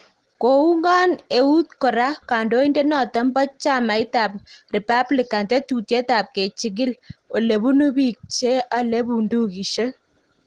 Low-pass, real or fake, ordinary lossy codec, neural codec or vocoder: 10.8 kHz; real; Opus, 16 kbps; none